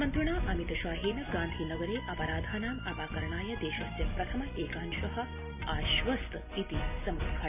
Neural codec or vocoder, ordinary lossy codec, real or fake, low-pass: none; none; real; 3.6 kHz